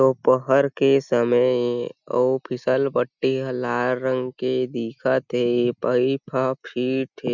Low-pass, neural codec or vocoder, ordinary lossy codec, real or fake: 7.2 kHz; none; none; real